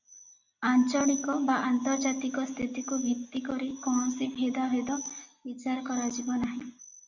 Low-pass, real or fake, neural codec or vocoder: 7.2 kHz; real; none